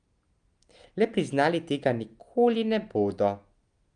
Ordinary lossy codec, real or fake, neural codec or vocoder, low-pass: Opus, 32 kbps; real; none; 9.9 kHz